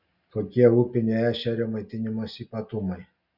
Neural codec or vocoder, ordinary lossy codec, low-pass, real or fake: none; Opus, 64 kbps; 5.4 kHz; real